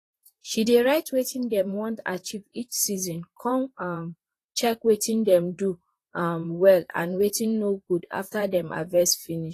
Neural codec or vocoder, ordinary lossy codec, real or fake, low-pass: vocoder, 44.1 kHz, 128 mel bands, Pupu-Vocoder; AAC, 48 kbps; fake; 14.4 kHz